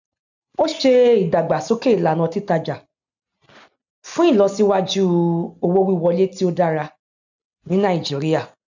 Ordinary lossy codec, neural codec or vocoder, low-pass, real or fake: none; none; 7.2 kHz; real